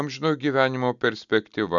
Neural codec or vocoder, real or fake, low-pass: none; real; 7.2 kHz